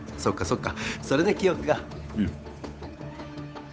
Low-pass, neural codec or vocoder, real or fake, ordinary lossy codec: none; codec, 16 kHz, 8 kbps, FunCodec, trained on Chinese and English, 25 frames a second; fake; none